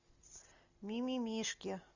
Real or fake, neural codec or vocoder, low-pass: real; none; 7.2 kHz